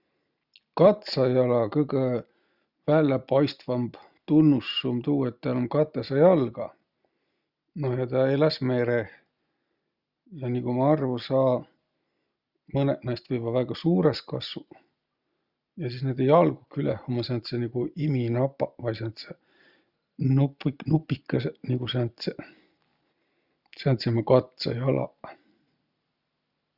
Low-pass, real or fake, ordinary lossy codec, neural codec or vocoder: 5.4 kHz; real; Opus, 64 kbps; none